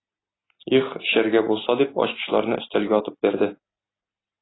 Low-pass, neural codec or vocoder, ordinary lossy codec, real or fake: 7.2 kHz; none; AAC, 16 kbps; real